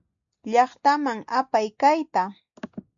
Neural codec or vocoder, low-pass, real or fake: none; 7.2 kHz; real